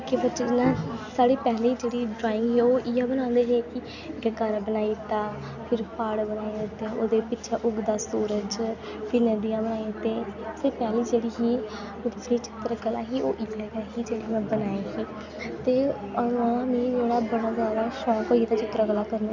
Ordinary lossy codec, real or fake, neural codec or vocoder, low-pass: none; real; none; 7.2 kHz